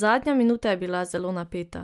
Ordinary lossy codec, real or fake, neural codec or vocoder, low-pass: Opus, 32 kbps; real; none; 10.8 kHz